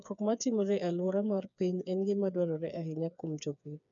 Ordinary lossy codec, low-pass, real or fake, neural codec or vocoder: none; 7.2 kHz; fake; codec, 16 kHz, 4 kbps, FreqCodec, smaller model